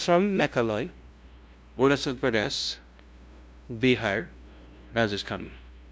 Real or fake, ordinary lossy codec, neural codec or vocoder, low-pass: fake; none; codec, 16 kHz, 0.5 kbps, FunCodec, trained on LibriTTS, 25 frames a second; none